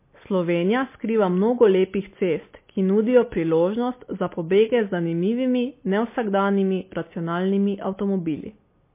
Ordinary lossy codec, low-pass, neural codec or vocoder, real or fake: MP3, 24 kbps; 3.6 kHz; none; real